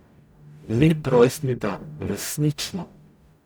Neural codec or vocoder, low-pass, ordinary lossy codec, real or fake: codec, 44.1 kHz, 0.9 kbps, DAC; none; none; fake